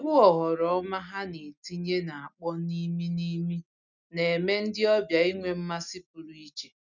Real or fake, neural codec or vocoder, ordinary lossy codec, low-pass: real; none; none; 7.2 kHz